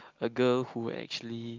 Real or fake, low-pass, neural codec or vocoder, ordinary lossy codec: real; 7.2 kHz; none; Opus, 24 kbps